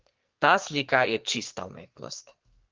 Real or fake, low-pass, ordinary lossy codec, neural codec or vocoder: fake; 7.2 kHz; Opus, 32 kbps; codec, 44.1 kHz, 2.6 kbps, SNAC